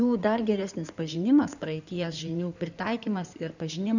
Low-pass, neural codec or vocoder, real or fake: 7.2 kHz; codec, 16 kHz in and 24 kHz out, 2.2 kbps, FireRedTTS-2 codec; fake